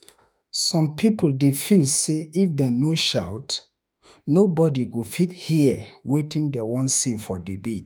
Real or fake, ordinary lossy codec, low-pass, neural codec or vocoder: fake; none; none; autoencoder, 48 kHz, 32 numbers a frame, DAC-VAE, trained on Japanese speech